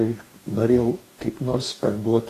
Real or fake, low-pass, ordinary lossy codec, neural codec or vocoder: fake; 14.4 kHz; AAC, 48 kbps; codec, 44.1 kHz, 2.6 kbps, DAC